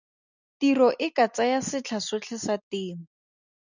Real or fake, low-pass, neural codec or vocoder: real; 7.2 kHz; none